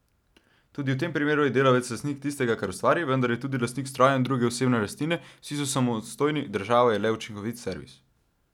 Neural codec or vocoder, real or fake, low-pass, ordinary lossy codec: none; real; 19.8 kHz; none